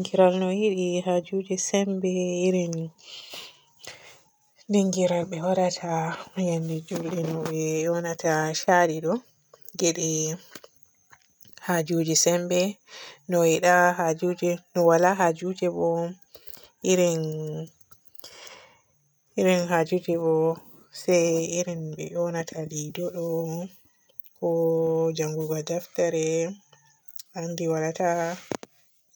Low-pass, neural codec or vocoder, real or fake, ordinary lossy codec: none; none; real; none